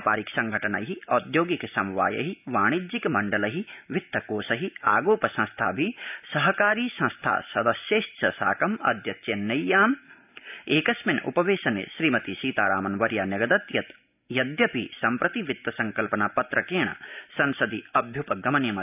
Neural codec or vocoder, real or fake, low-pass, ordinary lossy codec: none; real; 3.6 kHz; none